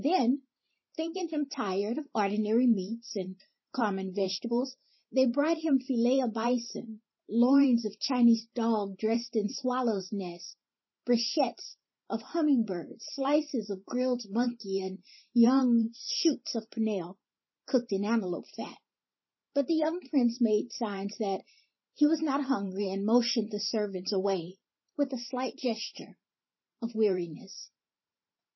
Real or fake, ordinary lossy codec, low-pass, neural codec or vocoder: fake; MP3, 24 kbps; 7.2 kHz; vocoder, 44.1 kHz, 128 mel bands every 512 samples, BigVGAN v2